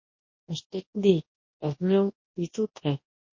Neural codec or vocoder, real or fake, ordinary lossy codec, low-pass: codec, 24 kHz, 0.9 kbps, WavTokenizer, large speech release; fake; MP3, 32 kbps; 7.2 kHz